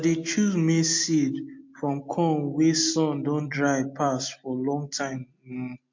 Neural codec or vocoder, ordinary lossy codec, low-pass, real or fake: none; MP3, 48 kbps; 7.2 kHz; real